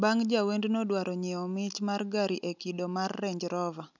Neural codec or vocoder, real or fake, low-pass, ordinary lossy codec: none; real; 7.2 kHz; none